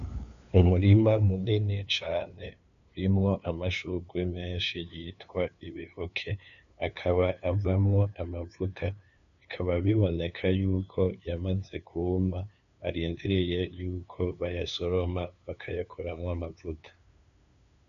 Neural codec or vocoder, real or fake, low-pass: codec, 16 kHz, 2 kbps, FunCodec, trained on LibriTTS, 25 frames a second; fake; 7.2 kHz